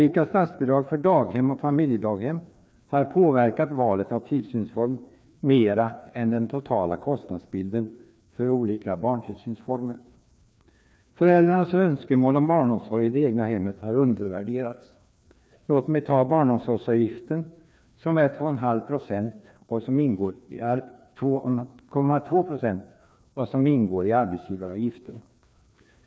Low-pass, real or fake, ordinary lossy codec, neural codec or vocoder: none; fake; none; codec, 16 kHz, 2 kbps, FreqCodec, larger model